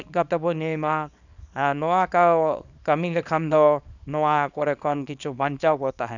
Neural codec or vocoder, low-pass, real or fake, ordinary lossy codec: codec, 24 kHz, 0.9 kbps, WavTokenizer, small release; 7.2 kHz; fake; none